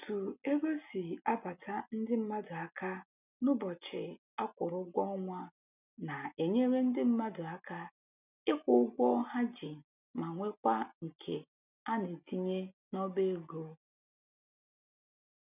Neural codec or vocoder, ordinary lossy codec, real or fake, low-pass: none; none; real; 3.6 kHz